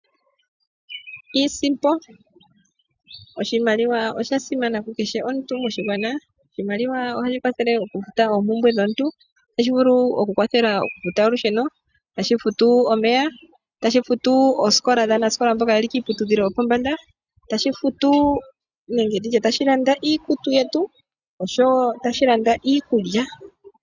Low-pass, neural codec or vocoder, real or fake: 7.2 kHz; none; real